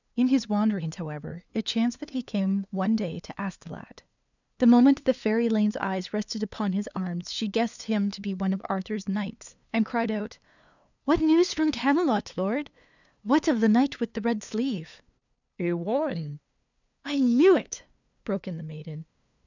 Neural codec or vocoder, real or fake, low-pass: codec, 16 kHz, 2 kbps, FunCodec, trained on LibriTTS, 25 frames a second; fake; 7.2 kHz